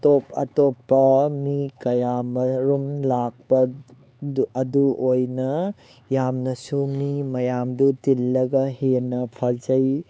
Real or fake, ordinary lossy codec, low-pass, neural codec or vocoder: fake; none; none; codec, 16 kHz, 4 kbps, X-Codec, HuBERT features, trained on LibriSpeech